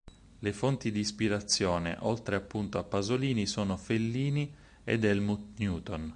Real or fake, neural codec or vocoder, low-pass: real; none; 9.9 kHz